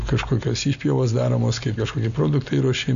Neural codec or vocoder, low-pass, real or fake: none; 7.2 kHz; real